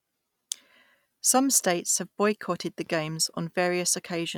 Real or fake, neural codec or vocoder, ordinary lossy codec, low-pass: real; none; none; 19.8 kHz